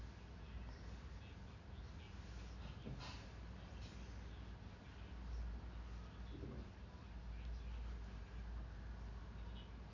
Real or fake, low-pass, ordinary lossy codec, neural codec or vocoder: real; 7.2 kHz; none; none